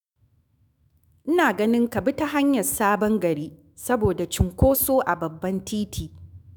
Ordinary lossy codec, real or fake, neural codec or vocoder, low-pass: none; fake; autoencoder, 48 kHz, 128 numbers a frame, DAC-VAE, trained on Japanese speech; none